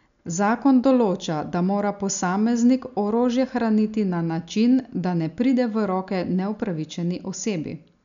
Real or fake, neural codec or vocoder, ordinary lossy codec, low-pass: real; none; none; 7.2 kHz